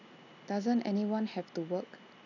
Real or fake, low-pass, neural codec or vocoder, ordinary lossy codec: real; 7.2 kHz; none; none